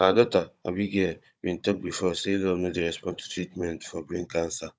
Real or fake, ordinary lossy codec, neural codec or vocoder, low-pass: fake; none; codec, 16 kHz, 16 kbps, FunCodec, trained on Chinese and English, 50 frames a second; none